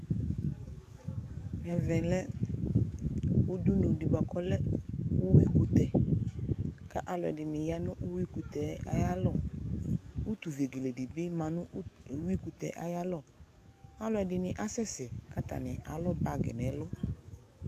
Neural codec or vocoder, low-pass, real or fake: codec, 44.1 kHz, 7.8 kbps, DAC; 14.4 kHz; fake